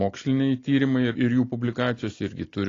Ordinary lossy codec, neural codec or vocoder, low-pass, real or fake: AAC, 32 kbps; none; 7.2 kHz; real